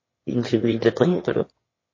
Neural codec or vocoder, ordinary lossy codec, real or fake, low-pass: autoencoder, 22.05 kHz, a latent of 192 numbers a frame, VITS, trained on one speaker; MP3, 32 kbps; fake; 7.2 kHz